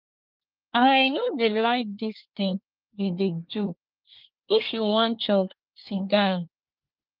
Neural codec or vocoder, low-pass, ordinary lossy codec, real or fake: codec, 24 kHz, 1 kbps, SNAC; 5.4 kHz; Opus, 24 kbps; fake